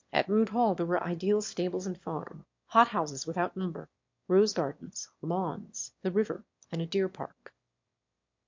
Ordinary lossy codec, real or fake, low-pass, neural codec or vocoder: MP3, 48 kbps; fake; 7.2 kHz; autoencoder, 22.05 kHz, a latent of 192 numbers a frame, VITS, trained on one speaker